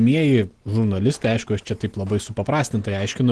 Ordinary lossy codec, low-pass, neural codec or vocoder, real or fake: Opus, 16 kbps; 10.8 kHz; none; real